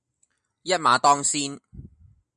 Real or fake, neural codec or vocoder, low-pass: real; none; 9.9 kHz